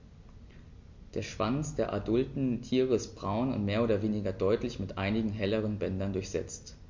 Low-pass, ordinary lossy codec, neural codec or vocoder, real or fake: 7.2 kHz; MP3, 48 kbps; none; real